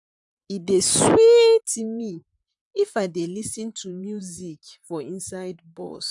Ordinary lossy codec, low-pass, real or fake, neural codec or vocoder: none; 10.8 kHz; fake; vocoder, 44.1 kHz, 128 mel bands, Pupu-Vocoder